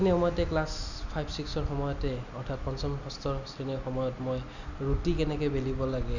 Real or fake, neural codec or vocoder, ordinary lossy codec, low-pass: real; none; none; 7.2 kHz